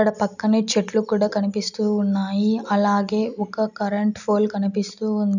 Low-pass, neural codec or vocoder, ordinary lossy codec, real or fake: 7.2 kHz; none; none; real